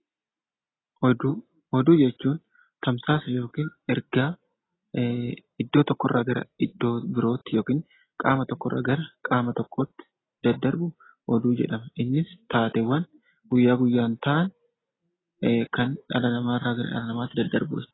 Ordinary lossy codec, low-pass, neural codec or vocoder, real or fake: AAC, 16 kbps; 7.2 kHz; none; real